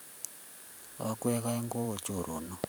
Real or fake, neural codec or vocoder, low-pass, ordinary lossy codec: fake; vocoder, 44.1 kHz, 128 mel bands every 512 samples, BigVGAN v2; none; none